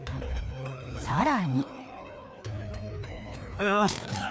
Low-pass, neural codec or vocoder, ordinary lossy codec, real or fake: none; codec, 16 kHz, 2 kbps, FreqCodec, larger model; none; fake